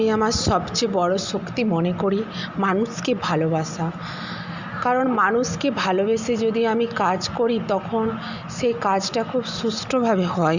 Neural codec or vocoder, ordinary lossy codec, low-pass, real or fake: none; Opus, 64 kbps; 7.2 kHz; real